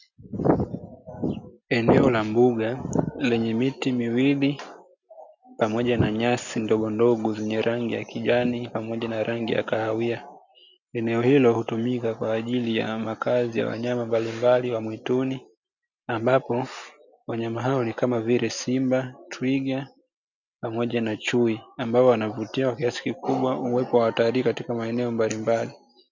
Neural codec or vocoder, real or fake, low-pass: none; real; 7.2 kHz